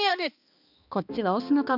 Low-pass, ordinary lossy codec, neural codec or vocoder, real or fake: 5.4 kHz; none; codec, 16 kHz, 2 kbps, X-Codec, HuBERT features, trained on balanced general audio; fake